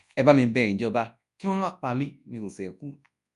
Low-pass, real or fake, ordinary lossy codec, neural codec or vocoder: 10.8 kHz; fake; none; codec, 24 kHz, 0.9 kbps, WavTokenizer, large speech release